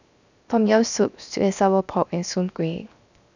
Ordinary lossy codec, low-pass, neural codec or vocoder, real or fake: none; 7.2 kHz; codec, 16 kHz, 0.7 kbps, FocalCodec; fake